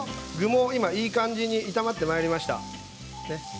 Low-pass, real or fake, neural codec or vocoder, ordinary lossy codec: none; real; none; none